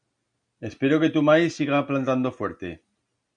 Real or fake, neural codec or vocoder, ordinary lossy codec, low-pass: real; none; MP3, 96 kbps; 9.9 kHz